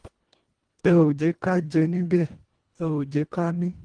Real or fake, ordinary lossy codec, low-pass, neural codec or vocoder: fake; Opus, 24 kbps; 9.9 kHz; codec, 24 kHz, 1.5 kbps, HILCodec